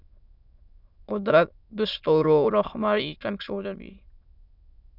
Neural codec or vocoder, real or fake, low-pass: autoencoder, 22.05 kHz, a latent of 192 numbers a frame, VITS, trained on many speakers; fake; 5.4 kHz